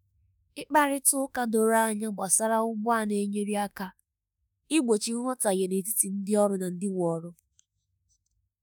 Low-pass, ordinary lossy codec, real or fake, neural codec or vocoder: none; none; fake; autoencoder, 48 kHz, 32 numbers a frame, DAC-VAE, trained on Japanese speech